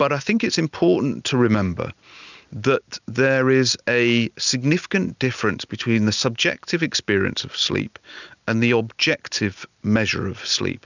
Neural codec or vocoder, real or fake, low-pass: none; real; 7.2 kHz